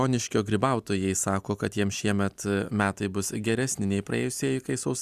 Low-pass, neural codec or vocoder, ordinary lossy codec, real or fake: 14.4 kHz; none; Opus, 64 kbps; real